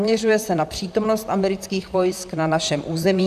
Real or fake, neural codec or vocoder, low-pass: fake; vocoder, 44.1 kHz, 128 mel bands, Pupu-Vocoder; 14.4 kHz